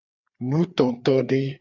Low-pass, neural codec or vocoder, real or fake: 7.2 kHz; codec, 16 kHz, 4 kbps, FreqCodec, larger model; fake